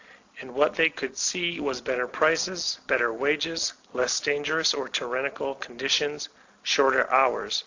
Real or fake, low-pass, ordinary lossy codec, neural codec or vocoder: real; 7.2 kHz; AAC, 48 kbps; none